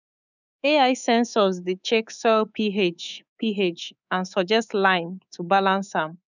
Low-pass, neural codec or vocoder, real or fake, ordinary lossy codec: 7.2 kHz; codec, 24 kHz, 3.1 kbps, DualCodec; fake; none